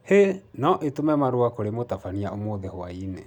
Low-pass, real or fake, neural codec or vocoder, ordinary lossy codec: 19.8 kHz; real; none; none